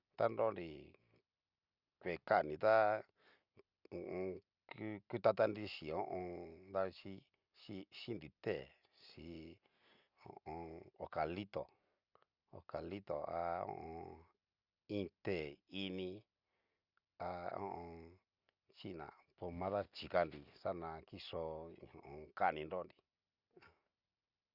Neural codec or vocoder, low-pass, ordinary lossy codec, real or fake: none; 5.4 kHz; Opus, 64 kbps; real